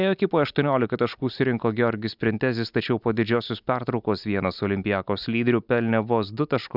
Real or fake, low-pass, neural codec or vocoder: real; 5.4 kHz; none